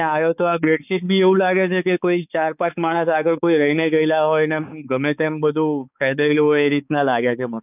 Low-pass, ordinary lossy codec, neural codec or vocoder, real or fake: 3.6 kHz; none; codec, 16 kHz, 4 kbps, X-Codec, HuBERT features, trained on balanced general audio; fake